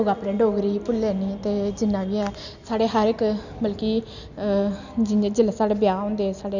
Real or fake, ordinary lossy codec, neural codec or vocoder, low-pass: real; none; none; 7.2 kHz